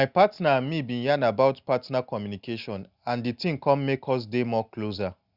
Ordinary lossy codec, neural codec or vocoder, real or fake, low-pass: Opus, 64 kbps; none; real; 5.4 kHz